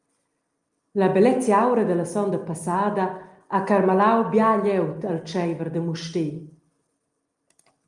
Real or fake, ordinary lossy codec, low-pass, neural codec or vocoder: real; Opus, 32 kbps; 10.8 kHz; none